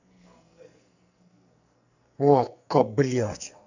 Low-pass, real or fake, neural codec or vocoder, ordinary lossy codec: 7.2 kHz; fake; codec, 44.1 kHz, 2.6 kbps, SNAC; none